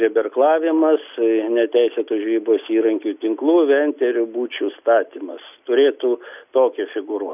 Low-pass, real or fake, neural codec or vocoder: 3.6 kHz; real; none